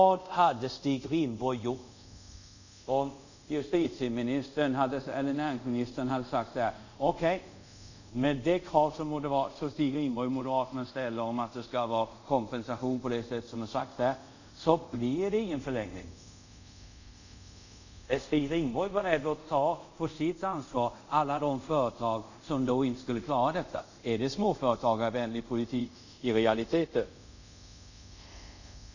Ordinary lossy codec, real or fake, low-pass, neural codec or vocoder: none; fake; 7.2 kHz; codec, 24 kHz, 0.5 kbps, DualCodec